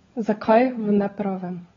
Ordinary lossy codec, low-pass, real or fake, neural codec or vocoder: AAC, 24 kbps; 7.2 kHz; fake; codec, 16 kHz, 16 kbps, FunCodec, trained on LibriTTS, 50 frames a second